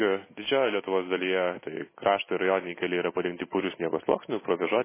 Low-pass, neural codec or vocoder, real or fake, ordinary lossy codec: 3.6 kHz; none; real; MP3, 16 kbps